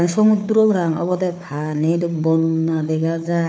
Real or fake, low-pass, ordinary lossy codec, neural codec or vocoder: fake; none; none; codec, 16 kHz, 4 kbps, FunCodec, trained on Chinese and English, 50 frames a second